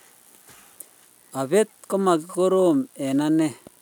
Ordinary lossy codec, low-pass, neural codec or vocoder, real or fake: none; 19.8 kHz; none; real